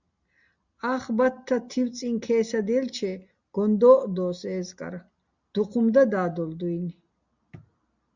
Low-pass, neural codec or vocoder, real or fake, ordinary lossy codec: 7.2 kHz; none; real; Opus, 64 kbps